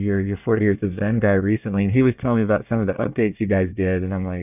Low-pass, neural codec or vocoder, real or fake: 3.6 kHz; codec, 24 kHz, 1 kbps, SNAC; fake